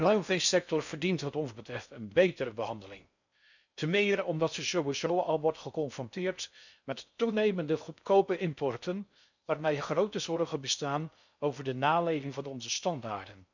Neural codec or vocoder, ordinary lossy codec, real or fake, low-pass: codec, 16 kHz in and 24 kHz out, 0.6 kbps, FocalCodec, streaming, 4096 codes; none; fake; 7.2 kHz